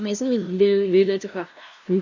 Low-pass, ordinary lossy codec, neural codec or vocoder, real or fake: 7.2 kHz; none; codec, 16 kHz, 0.5 kbps, FunCodec, trained on LibriTTS, 25 frames a second; fake